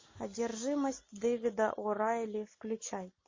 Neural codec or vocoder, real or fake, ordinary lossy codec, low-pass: none; real; MP3, 32 kbps; 7.2 kHz